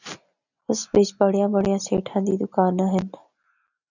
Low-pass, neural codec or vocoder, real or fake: 7.2 kHz; none; real